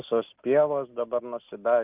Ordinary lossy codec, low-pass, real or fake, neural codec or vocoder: Opus, 24 kbps; 3.6 kHz; real; none